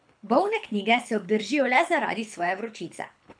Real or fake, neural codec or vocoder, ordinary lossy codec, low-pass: fake; codec, 24 kHz, 6 kbps, HILCodec; none; 9.9 kHz